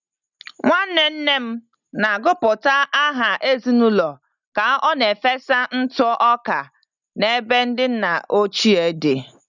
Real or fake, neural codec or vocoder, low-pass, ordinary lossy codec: real; none; 7.2 kHz; none